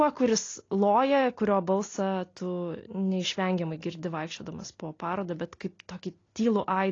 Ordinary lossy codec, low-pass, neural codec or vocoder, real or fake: AAC, 32 kbps; 7.2 kHz; none; real